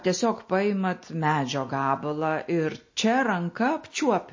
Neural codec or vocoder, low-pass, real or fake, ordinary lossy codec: none; 7.2 kHz; real; MP3, 32 kbps